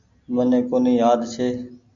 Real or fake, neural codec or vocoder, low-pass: real; none; 7.2 kHz